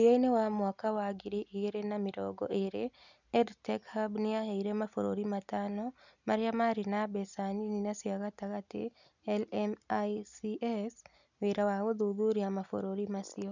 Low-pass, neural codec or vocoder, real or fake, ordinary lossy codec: 7.2 kHz; none; real; none